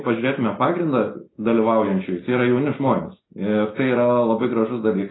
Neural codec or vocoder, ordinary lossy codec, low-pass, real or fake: none; AAC, 16 kbps; 7.2 kHz; real